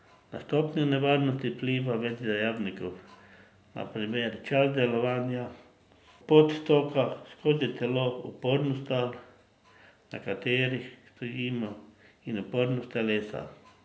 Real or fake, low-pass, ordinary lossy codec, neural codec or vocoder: real; none; none; none